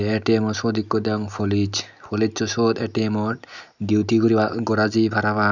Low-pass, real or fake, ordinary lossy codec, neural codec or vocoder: 7.2 kHz; real; none; none